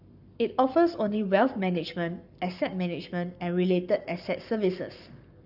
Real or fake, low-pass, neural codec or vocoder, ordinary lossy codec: fake; 5.4 kHz; codec, 44.1 kHz, 7.8 kbps, Pupu-Codec; none